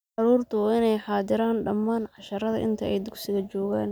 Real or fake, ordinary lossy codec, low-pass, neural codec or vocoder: real; none; none; none